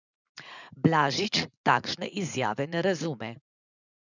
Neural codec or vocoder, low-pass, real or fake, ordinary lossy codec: vocoder, 44.1 kHz, 80 mel bands, Vocos; 7.2 kHz; fake; none